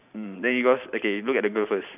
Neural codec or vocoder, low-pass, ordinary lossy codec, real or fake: vocoder, 44.1 kHz, 128 mel bands every 512 samples, BigVGAN v2; 3.6 kHz; none; fake